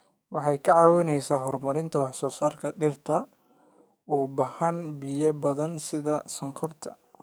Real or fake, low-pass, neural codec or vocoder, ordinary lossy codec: fake; none; codec, 44.1 kHz, 2.6 kbps, SNAC; none